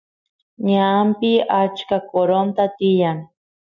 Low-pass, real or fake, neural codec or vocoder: 7.2 kHz; real; none